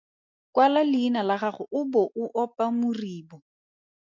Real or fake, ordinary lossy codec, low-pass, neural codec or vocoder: real; MP3, 48 kbps; 7.2 kHz; none